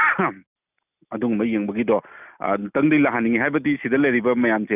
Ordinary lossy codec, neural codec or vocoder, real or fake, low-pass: none; none; real; 3.6 kHz